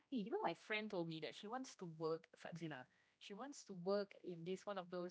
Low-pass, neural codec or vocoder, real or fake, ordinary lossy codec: none; codec, 16 kHz, 1 kbps, X-Codec, HuBERT features, trained on general audio; fake; none